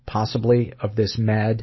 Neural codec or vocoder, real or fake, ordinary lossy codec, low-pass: none; real; MP3, 24 kbps; 7.2 kHz